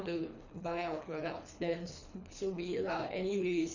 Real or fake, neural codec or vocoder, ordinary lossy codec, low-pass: fake; codec, 24 kHz, 3 kbps, HILCodec; none; 7.2 kHz